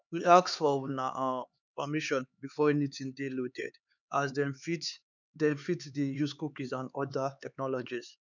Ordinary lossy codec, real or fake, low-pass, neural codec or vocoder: none; fake; 7.2 kHz; codec, 16 kHz, 4 kbps, X-Codec, HuBERT features, trained on LibriSpeech